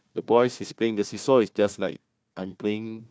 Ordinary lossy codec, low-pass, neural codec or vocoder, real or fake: none; none; codec, 16 kHz, 1 kbps, FunCodec, trained on Chinese and English, 50 frames a second; fake